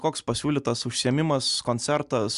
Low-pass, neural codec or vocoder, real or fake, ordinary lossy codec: 10.8 kHz; none; real; Opus, 64 kbps